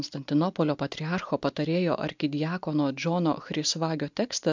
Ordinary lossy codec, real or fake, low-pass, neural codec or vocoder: MP3, 64 kbps; real; 7.2 kHz; none